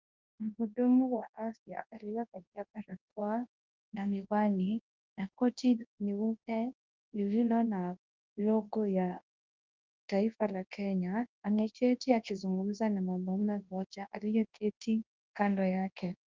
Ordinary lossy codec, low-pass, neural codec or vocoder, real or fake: Opus, 32 kbps; 7.2 kHz; codec, 24 kHz, 0.9 kbps, WavTokenizer, large speech release; fake